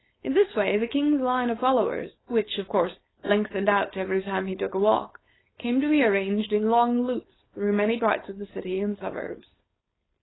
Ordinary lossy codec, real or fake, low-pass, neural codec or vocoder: AAC, 16 kbps; fake; 7.2 kHz; codec, 16 kHz, 4.8 kbps, FACodec